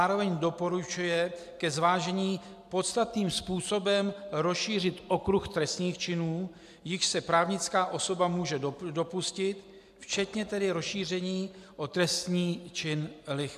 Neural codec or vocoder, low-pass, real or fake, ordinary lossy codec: none; 14.4 kHz; real; AAC, 96 kbps